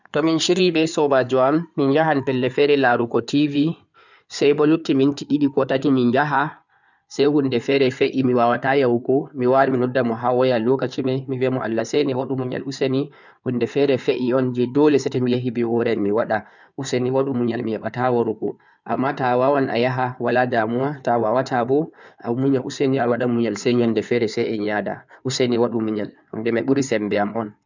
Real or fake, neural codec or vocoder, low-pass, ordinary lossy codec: fake; codec, 16 kHz in and 24 kHz out, 2.2 kbps, FireRedTTS-2 codec; 7.2 kHz; none